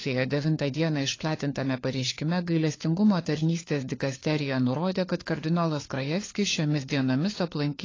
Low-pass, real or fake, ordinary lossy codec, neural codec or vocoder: 7.2 kHz; fake; AAC, 32 kbps; codec, 16 kHz, 4 kbps, FunCodec, trained on LibriTTS, 50 frames a second